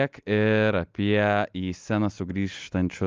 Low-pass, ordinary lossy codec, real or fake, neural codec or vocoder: 7.2 kHz; Opus, 24 kbps; real; none